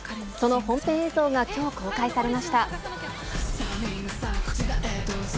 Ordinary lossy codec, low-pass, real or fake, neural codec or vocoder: none; none; real; none